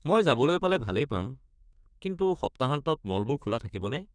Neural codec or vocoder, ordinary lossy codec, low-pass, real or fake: codec, 32 kHz, 1.9 kbps, SNAC; none; 9.9 kHz; fake